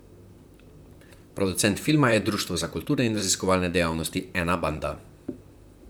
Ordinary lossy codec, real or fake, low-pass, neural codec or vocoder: none; fake; none; vocoder, 44.1 kHz, 128 mel bands, Pupu-Vocoder